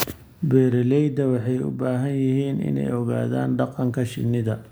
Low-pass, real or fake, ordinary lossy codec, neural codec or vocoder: none; real; none; none